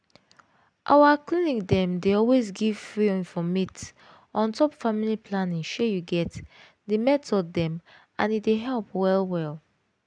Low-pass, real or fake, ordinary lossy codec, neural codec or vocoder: 9.9 kHz; real; none; none